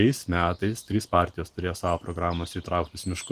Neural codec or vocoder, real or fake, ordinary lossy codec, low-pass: none; real; Opus, 24 kbps; 14.4 kHz